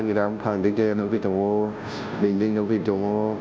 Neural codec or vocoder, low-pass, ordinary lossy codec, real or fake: codec, 16 kHz, 0.5 kbps, FunCodec, trained on Chinese and English, 25 frames a second; none; none; fake